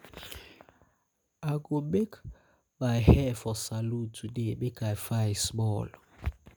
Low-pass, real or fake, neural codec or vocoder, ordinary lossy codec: none; real; none; none